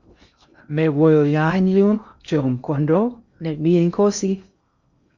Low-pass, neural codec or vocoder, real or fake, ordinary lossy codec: 7.2 kHz; codec, 16 kHz in and 24 kHz out, 0.6 kbps, FocalCodec, streaming, 2048 codes; fake; Opus, 64 kbps